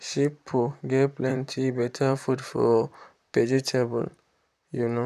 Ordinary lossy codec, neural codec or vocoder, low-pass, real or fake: none; vocoder, 44.1 kHz, 128 mel bands, Pupu-Vocoder; 14.4 kHz; fake